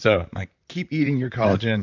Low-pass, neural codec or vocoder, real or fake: 7.2 kHz; vocoder, 44.1 kHz, 128 mel bands, Pupu-Vocoder; fake